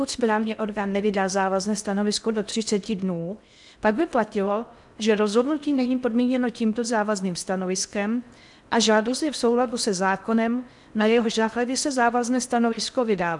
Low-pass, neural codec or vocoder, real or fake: 10.8 kHz; codec, 16 kHz in and 24 kHz out, 0.6 kbps, FocalCodec, streaming, 4096 codes; fake